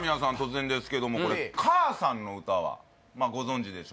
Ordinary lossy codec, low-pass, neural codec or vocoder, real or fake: none; none; none; real